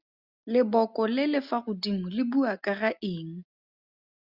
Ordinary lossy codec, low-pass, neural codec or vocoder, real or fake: Opus, 64 kbps; 5.4 kHz; none; real